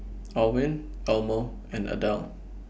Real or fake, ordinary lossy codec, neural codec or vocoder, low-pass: real; none; none; none